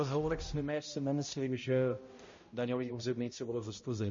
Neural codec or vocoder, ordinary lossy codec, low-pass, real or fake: codec, 16 kHz, 0.5 kbps, X-Codec, HuBERT features, trained on balanced general audio; MP3, 32 kbps; 7.2 kHz; fake